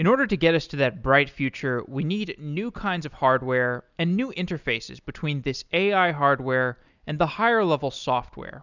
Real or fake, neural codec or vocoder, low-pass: real; none; 7.2 kHz